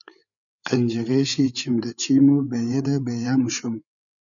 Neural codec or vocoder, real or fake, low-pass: codec, 16 kHz, 8 kbps, FreqCodec, larger model; fake; 7.2 kHz